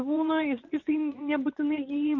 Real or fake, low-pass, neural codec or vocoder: fake; 7.2 kHz; vocoder, 24 kHz, 100 mel bands, Vocos